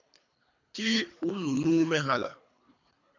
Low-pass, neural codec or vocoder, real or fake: 7.2 kHz; codec, 24 kHz, 3 kbps, HILCodec; fake